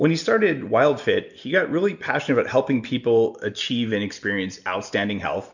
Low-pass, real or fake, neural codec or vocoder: 7.2 kHz; real; none